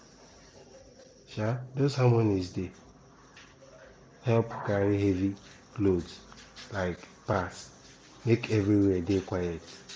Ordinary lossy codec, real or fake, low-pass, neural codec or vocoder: none; real; none; none